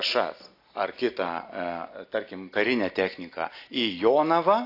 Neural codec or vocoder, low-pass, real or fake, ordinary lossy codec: none; 5.4 kHz; real; AAC, 32 kbps